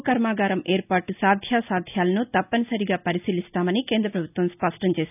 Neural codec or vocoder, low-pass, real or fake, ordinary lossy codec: none; 3.6 kHz; real; none